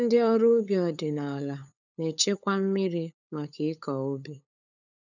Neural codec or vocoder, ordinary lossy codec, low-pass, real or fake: codec, 16 kHz, 8 kbps, FunCodec, trained on LibriTTS, 25 frames a second; none; 7.2 kHz; fake